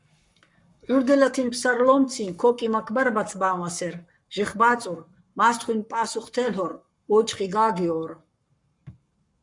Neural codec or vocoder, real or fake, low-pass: codec, 44.1 kHz, 7.8 kbps, Pupu-Codec; fake; 10.8 kHz